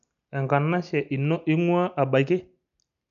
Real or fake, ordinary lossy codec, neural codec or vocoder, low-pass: real; none; none; 7.2 kHz